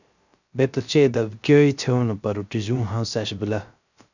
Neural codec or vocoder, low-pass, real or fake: codec, 16 kHz, 0.3 kbps, FocalCodec; 7.2 kHz; fake